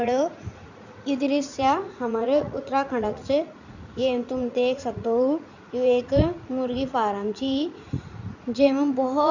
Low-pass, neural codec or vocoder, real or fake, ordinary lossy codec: 7.2 kHz; vocoder, 44.1 kHz, 80 mel bands, Vocos; fake; none